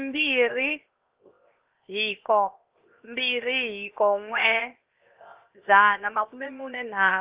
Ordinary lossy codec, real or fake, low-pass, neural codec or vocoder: Opus, 32 kbps; fake; 3.6 kHz; codec, 16 kHz, 0.7 kbps, FocalCodec